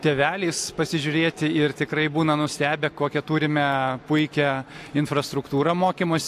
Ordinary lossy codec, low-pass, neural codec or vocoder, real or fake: AAC, 64 kbps; 14.4 kHz; none; real